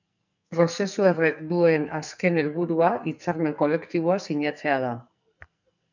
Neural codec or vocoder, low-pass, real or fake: codec, 44.1 kHz, 2.6 kbps, SNAC; 7.2 kHz; fake